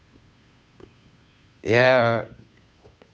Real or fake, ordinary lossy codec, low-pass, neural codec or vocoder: fake; none; none; codec, 16 kHz, 2 kbps, FunCodec, trained on Chinese and English, 25 frames a second